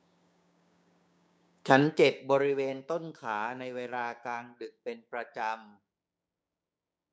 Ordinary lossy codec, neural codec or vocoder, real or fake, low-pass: none; codec, 16 kHz, 6 kbps, DAC; fake; none